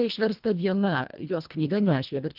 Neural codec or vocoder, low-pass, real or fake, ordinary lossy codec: codec, 24 kHz, 1.5 kbps, HILCodec; 5.4 kHz; fake; Opus, 24 kbps